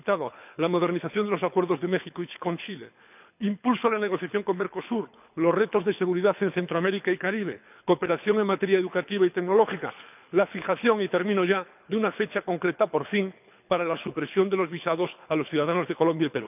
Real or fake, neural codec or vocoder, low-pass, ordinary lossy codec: fake; codec, 24 kHz, 6 kbps, HILCodec; 3.6 kHz; none